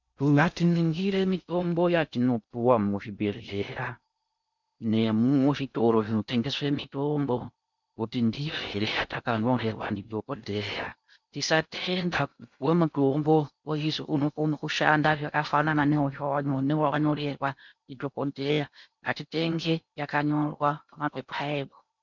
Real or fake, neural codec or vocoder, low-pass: fake; codec, 16 kHz in and 24 kHz out, 0.6 kbps, FocalCodec, streaming, 2048 codes; 7.2 kHz